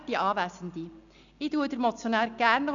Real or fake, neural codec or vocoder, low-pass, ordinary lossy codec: real; none; 7.2 kHz; none